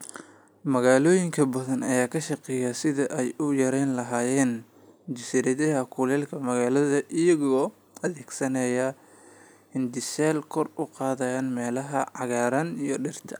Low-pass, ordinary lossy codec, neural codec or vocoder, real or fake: none; none; none; real